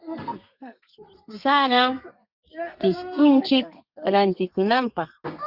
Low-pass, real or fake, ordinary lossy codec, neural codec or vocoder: 5.4 kHz; fake; Opus, 64 kbps; codec, 32 kHz, 1.9 kbps, SNAC